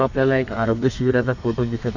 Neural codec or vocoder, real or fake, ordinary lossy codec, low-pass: codec, 32 kHz, 1.9 kbps, SNAC; fake; none; 7.2 kHz